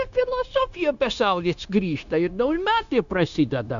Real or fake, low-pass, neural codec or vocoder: fake; 7.2 kHz; codec, 16 kHz, 0.9 kbps, LongCat-Audio-Codec